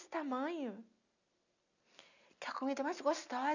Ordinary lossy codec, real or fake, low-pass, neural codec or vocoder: none; real; 7.2 kHz; none